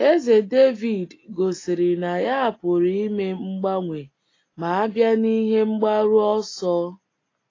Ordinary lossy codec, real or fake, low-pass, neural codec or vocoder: AAC, 32 kbps; real; 7.2 kHz; none